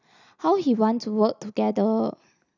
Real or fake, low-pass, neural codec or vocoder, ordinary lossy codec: real; 7.2 kHz; none; none